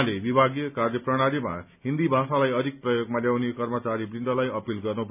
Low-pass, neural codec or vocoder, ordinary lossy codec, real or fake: 3.6 kHz; none; none; real